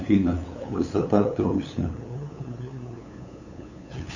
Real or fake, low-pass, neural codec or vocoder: fake; 7.2 kHz; codec, 16 kHz, 8 kbps, FunCodec, trained on LibriTTS, 25 frames a second